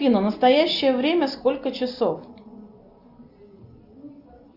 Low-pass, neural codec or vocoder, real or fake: 5.4 kHz; none; real